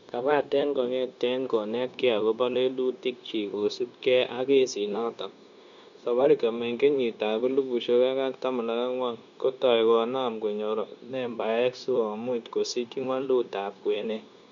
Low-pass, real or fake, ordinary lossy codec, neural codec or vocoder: 7.2 kHz; fake; MP3, 64 kbps; codec, 16 kHz, 0.9 kbps, LongCat-Audio-Codec